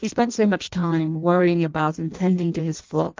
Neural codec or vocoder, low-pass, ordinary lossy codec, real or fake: codec, 16 kHz in and 24 kHz out, 0.6 kbps, FireRedTTS-2 codec; 7.2 kHz; Opus, 24 kbps; fake